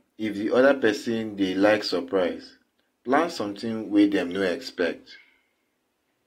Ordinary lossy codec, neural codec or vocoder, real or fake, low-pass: AAC, 48 kbps; none; real; 19.8 kHz